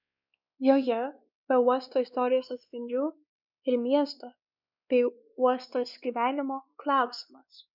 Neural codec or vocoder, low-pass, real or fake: codec, 16 kHz, 2 kbps, X-Codec, WavLM features, trained on Multilingual LibriSpeech; 5.4 kHz; fake